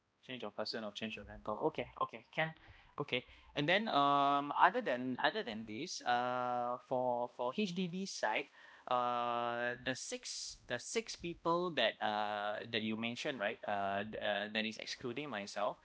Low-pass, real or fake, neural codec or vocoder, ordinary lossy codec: none; fake; codec, 16 kHz, 1 kbps, X-Codec, HuBERT features, trained on balanced general audio; none